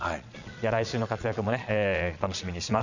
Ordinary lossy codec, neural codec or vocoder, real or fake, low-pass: none; vocoder, 22.05 kHz, 80 mel bands, Vocos; fake; 7.2 kHz